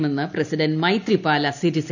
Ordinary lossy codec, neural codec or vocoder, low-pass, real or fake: none; none; 7.2 kHz; real